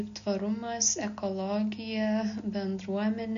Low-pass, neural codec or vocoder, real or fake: 7.2 kHz; none; real